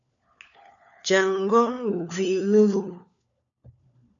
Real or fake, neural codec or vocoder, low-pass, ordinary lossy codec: fake; codec, 16 kHz, 4 kbps, FunCodec, trained on LibriTTS, 50 frames a second; 7.2 kHz; MP3, 96 kbps